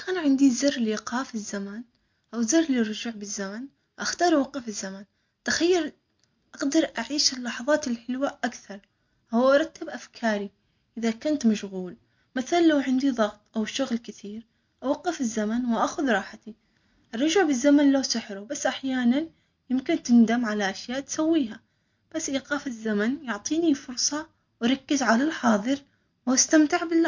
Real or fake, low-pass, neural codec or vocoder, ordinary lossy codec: real; 7.2 kHz; none; MP3, 48 kbps